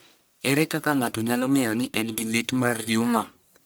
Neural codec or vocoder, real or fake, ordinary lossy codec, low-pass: codec, 44.1 kHz, 1.7 kbps, Pupu-Codec; fake; none; none